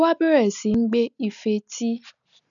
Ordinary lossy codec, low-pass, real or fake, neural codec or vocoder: none; 7.2 kHz; real; none